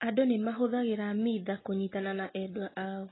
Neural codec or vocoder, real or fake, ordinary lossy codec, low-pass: none; real; AAC, 16 kbps; 7.2 kHz